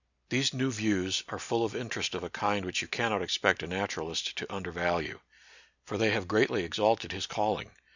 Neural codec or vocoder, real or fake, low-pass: none; real; 7.2 kHz